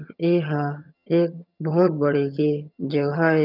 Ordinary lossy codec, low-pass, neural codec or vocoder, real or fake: none; 5.4 kHz; vocoder, 22.05 kHz, 80 mel bands, HiFi-GAN; fake